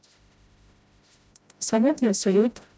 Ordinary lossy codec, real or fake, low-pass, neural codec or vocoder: none; fake; none; codec, 16 kHz, 0.5 kbps, FreqCodec, smaller model